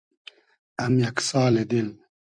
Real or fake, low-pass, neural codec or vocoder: fake; 9.9 kHz; vocoder, 44.1 kHz, 128 mel bands every 256 samples, BigVGAN v2